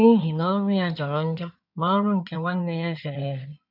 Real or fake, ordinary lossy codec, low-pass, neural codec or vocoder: fake; none; 5.4 kHz; codec, 16 kHz in and 24 kHz out, 2.2 kbps, FireRedTTS-2 codec